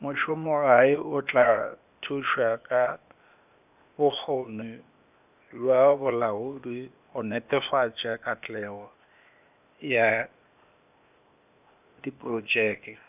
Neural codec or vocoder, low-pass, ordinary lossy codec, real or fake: codec, 16 kHz, 0.8 kbps, ZipCodec; 3.6 kHz; none; fake